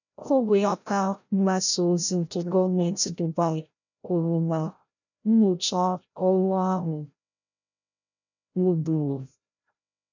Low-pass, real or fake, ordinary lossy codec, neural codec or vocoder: 7.2 kHz; fake; none; codec, 16 kHz, 0.5 kbps, FreqCodec, larger model